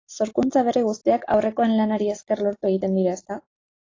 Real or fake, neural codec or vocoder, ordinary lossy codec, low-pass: real; none; AAC, 32 kbps; 7.2 kHz